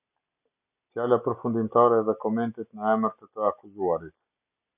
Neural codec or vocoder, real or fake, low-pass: none; real; 3.6 kHz